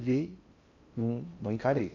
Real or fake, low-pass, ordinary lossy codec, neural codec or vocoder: fake; 7.2 kHz; AAC, 48 kbps; codec, 16 kHz in and 24 kHz out, 0.6 kbps, FocalCodec, streaming, 4096 codes